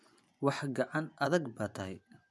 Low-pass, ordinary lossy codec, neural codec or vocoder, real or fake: none; none; vocoder, 24 kHz, 100 mel bands, Vocos; fake